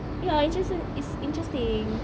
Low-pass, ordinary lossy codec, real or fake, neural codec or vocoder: none; none; real; none